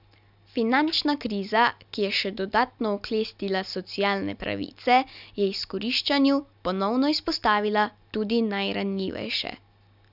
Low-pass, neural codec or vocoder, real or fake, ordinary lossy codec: 5.4 kHz; none; real; none